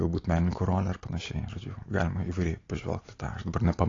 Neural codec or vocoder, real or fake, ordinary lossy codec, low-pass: none; real; AAC, 32 kbps; 7.2 kHz